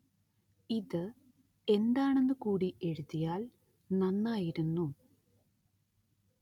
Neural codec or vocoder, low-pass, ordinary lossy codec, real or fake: none; 19.8 kHz; none; real